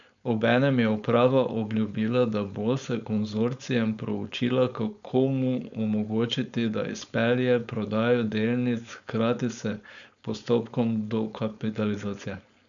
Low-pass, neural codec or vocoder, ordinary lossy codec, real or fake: 7.2 kHz; codec, 16 kHz, 4.8 kbps, FACodec; none; fake